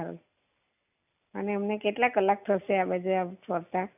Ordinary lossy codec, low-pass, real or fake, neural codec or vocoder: none; 3.6 kHz; real; none